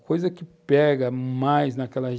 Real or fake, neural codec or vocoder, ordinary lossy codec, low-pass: real; none; none; none